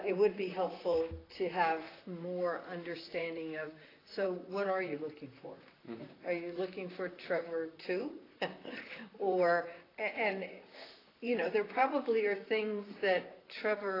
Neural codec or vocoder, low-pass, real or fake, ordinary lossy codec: vocoder, 44.1 kHz, 128 mel bands, Pupu-Vocoder; 5.4 kHz; fake; AAC, 24 kbps